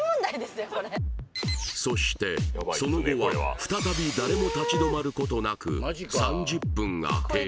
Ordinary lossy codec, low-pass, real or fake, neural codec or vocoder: none; none; real; none